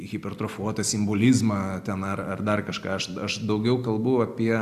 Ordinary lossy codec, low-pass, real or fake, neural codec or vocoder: AAC, 96 kbps; 14.4 kHz; real; none